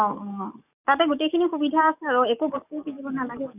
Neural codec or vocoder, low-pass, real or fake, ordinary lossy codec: codec, 44.1 kHz, 7.8 kbps, DAC; 3.6 kHz; fake; none